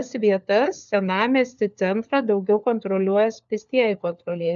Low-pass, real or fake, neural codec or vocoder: 7.2 kHz; fake; codec, 16 kHz, 4 kbps, FunCodec, trained on LibriTTS, 50 frames a second